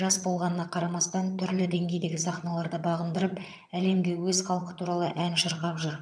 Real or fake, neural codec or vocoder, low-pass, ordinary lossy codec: fake; vocoder, 22.05 kHz, 80 mel bands, HiFi-GAN; none; none